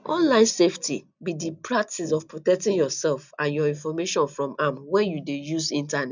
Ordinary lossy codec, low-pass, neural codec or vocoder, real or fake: none; 7.2 kHz; none; real